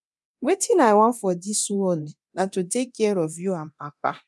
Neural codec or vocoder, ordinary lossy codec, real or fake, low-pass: codec, 24 kHz, 0.9 kbps, DualCodec; none; fake; none